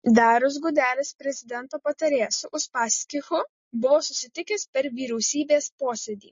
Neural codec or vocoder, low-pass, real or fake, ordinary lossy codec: none; 7.2 kHz; real; MP3, 32 kbps